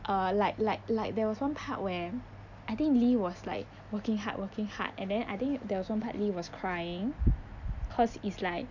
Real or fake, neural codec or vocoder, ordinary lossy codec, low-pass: real; none; none; 7.2 kHz